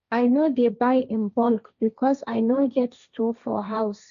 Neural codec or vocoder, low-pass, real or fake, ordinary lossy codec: codec, 16 kHz, 1.1 kbps, Voila-Tokenizer; 7.2 kHz; fake; none